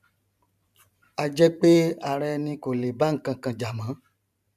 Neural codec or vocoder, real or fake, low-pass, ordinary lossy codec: vocoder, 44.1 kHz, 128 mel bands every 512 samples, BigVGAN v2; fake; 14.4 kHz; none